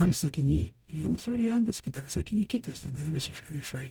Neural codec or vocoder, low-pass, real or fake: codec, 44.1 kHz, 0.9 kbps, DAC; 19.8 kHz; fake